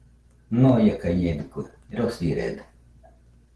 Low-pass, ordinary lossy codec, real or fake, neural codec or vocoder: 10.8 kHz; Opus, 16 kbps; real; none